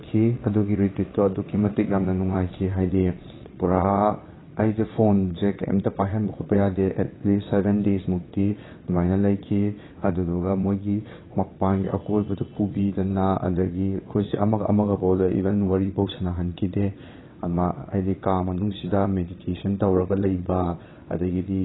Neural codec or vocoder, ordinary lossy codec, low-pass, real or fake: vocoder, 22.05 kHz, 80 mel bands, WaveNeXt; AAC, 16 kbps; 7.2 kHz; fake